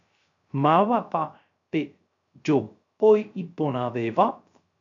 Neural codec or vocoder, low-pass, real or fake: codec, 16 kHz, 0.3 kbps, FocalCodec; 7.2 kHz; fake